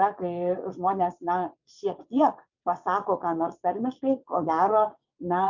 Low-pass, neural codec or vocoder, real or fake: 7.2 kHz; vocoder, 22.05 kHz, 80 mel bands, WaveNeXt; fake